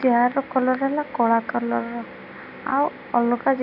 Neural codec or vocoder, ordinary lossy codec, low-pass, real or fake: none; none; 5.4 kHz; real